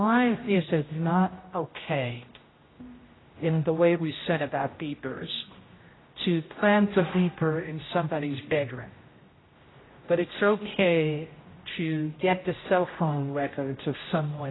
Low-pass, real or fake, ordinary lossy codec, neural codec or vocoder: 7.2 kHz; fake; AAC, 16 kbps; codec, 16 kHz, 0.5 kbps, X-Codec, HuBERT features, trained on general audio